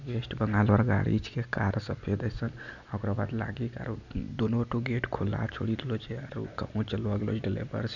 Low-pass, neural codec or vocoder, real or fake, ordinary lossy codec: 7.2 kHz; none; real; none